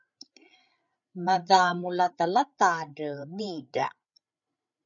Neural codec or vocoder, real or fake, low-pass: codec, 16 kHz, 8 kbps, FreqCodec, larger model; fake; 7.2 kHz